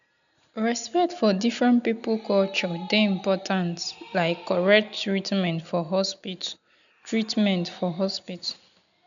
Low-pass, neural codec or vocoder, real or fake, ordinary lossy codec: 7.2 kHz; none; real; none